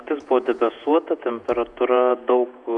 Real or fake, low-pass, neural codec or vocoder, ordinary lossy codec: fake; 10.8 kHz; vocoder, 48 kHz, 128 mel bands, Vocos; MP3, 96 kbps